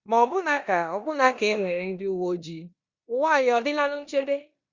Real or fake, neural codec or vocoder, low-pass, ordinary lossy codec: fake; codec, 16 kHz in and 24 kHz out, 0.9 kbps, LongCat-Audio-Codec, four codebook decoder; 7.2 kHz; Opus, 64 kbps